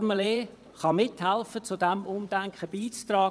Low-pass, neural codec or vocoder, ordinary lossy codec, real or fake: none; vocoder, 22.05 kHz, 80 mel bands, WaveNeXt; none; fake